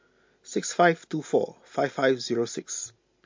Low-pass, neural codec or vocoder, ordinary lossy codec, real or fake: 7.2 kHz; none; MP3, 48 kbps; real